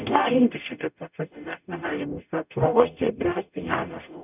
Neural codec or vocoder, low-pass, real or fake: codec, 44.1 kHz, 0.9 kbps, DAC; 3.6 kHz; fake